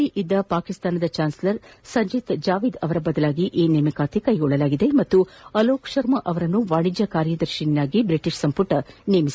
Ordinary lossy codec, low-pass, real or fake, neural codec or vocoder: none; none; real; none